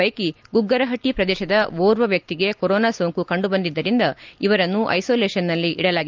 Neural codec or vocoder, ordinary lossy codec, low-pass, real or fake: none; Opus, 32 kbps; 7.2 kHz; real